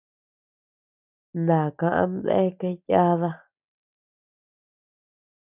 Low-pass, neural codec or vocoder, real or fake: 3.6 kHz; none; real